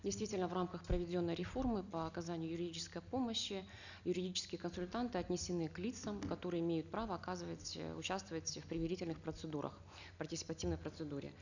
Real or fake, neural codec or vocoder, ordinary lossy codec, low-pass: real; none; none; 7.2 kHz